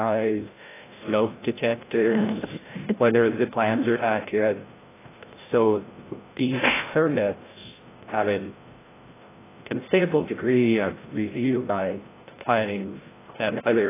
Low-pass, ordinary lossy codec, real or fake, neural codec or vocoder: 3.6 kHz; AAC, 16 kbps; fake; codec, 16 kHz, 0.5 kbps, FreqCodec, larger model